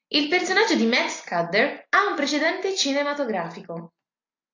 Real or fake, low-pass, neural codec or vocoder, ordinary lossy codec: real; 7.2 kHz; none; AAC, 48 kbps